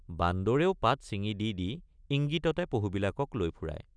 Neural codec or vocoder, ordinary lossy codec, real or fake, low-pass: none; none; real; 9.9 kHz